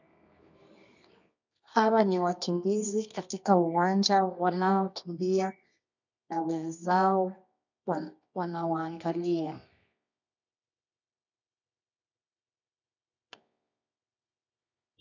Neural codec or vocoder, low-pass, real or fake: codec, 24 kHz, 0.9 kbps, WavTokenizer, medium music audio release; 7.2 kHz; fake